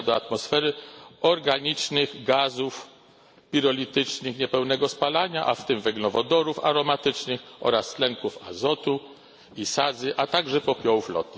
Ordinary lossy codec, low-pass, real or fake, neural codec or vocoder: none; none; real; none